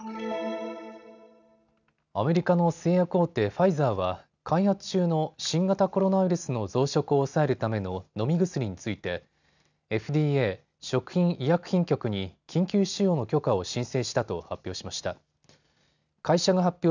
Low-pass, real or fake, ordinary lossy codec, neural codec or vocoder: 7.2 kHz; real; none; none